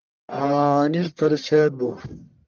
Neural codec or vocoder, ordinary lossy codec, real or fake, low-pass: codec, 44.1 kHz, 1.7 kbps, Pupu-Codec; Opus, 24 kbps; fake; 7.2 kHz